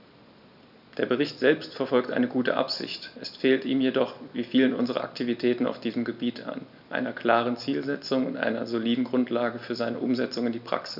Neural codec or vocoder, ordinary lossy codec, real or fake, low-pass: none; none; real; 5.4 kHz